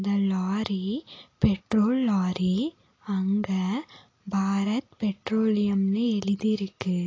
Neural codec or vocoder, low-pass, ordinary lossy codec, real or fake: none; 7.2 kHz; AAC, 32 kbps; real